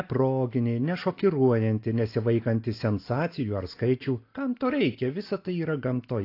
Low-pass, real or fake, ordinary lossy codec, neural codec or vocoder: 5.4 kHz; real; AAC, 32 kbps; none